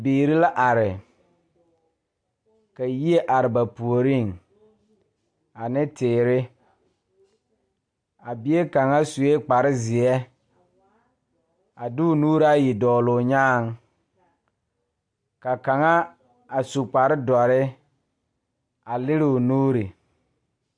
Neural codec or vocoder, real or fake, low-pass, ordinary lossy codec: none; real; 9.9 kHz; AAC, 64 kbps